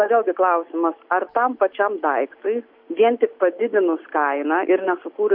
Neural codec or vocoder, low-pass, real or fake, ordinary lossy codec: none; 5.4 kHz; real; MP3, 48 kbps